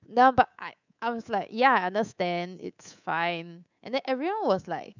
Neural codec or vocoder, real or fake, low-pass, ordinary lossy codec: codec, 24 kHz, 3.1 kbps, DualCodec; fake; 7.2 kHz; none